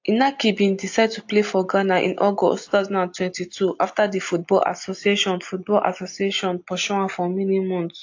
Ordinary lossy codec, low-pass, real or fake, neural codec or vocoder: AAC, 48 kbps; 7.2 kHz; real; none